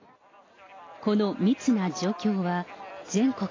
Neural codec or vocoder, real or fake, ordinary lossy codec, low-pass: none; real; AAC, 32 kbps; 7.2 kHz